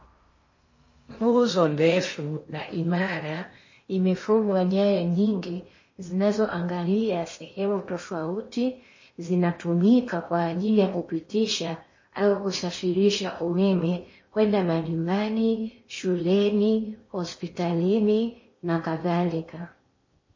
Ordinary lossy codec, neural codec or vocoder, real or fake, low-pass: MP3, 32 kbps; codec, 16 kHz in and 24 kHz out, 0.8 kbps, FocalCodec, streaming, 65536 codes; fake; 7.2 kHz